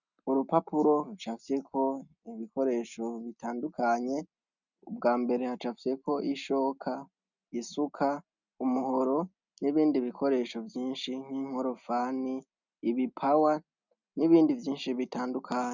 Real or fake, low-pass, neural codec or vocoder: real; 7.2 kHz; none